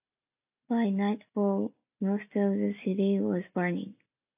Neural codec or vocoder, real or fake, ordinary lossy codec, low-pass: none; real; AAC, 32 kbps; 3.6 kHz